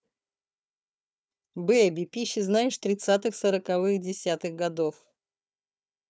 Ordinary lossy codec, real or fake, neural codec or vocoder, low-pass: none; fake; codec, 16 kHz, 4 kbps, FunCodec, trained on Chinese and English, 50 frames a second; none